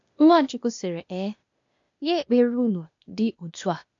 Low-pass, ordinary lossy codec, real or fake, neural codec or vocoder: 7.2 kHz; MP3, 64 kbps; fake; codec, 16 kHz, 0.8 kbps, ZipCodec